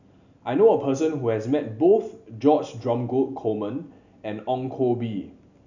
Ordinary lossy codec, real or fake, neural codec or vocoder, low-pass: none; real; none; 7.2 kHz